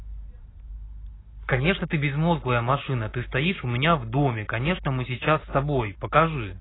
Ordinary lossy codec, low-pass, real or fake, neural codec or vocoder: AAC, 16 kbps; 7.2 kHz; real; none